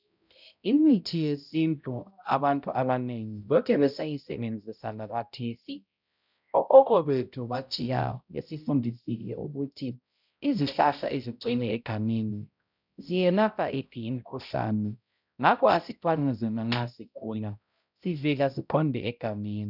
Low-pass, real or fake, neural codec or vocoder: 5.4 kHz; fake; codec, 16 kHz, 0.5 kbps, X-Codec, HuBERT features, trained on balanced general audio